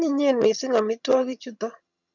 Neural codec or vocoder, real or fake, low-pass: vocoder, 22.05 kHz, 80 mel bands, HiFi-GAN; fake; 7.2 kHz